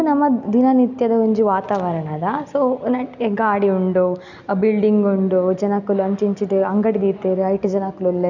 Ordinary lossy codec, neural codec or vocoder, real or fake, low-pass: none; none; real; 7.2 kHz